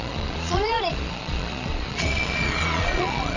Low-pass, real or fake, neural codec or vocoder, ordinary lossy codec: 7.2 kHz; fake; vocoder, 22.05 kHz, 80 mel bands, Vocos; none